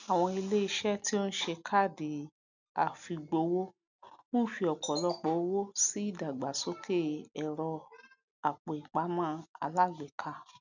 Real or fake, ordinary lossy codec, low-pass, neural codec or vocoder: real; none; 7.2 kHz; none